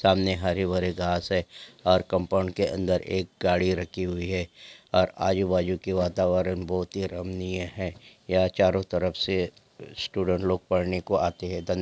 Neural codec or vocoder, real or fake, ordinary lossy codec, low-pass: none; real; none; none